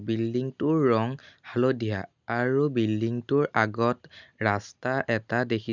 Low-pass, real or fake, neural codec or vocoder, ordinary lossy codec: 7.2 kHz; real; none; none